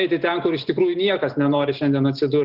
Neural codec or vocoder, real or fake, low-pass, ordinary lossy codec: none; real; 5.4 kHz; Opus, 16 kbps